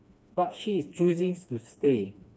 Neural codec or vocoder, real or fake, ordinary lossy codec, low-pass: codec, 16 kHz, 2 kbps, FreqCodec, smaller model; fake; none; none